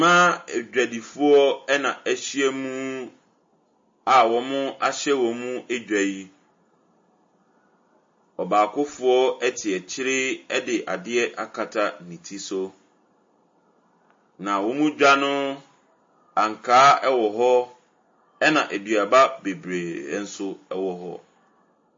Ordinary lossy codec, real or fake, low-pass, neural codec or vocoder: MP3, 32 kbps; real; 7.2 kHz; none